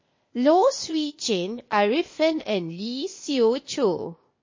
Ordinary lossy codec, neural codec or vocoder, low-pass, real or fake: MP3, 32 kbps; codec, 16 kHz, 0.8 kbps, ZipCodec; 7.2 kHz; fake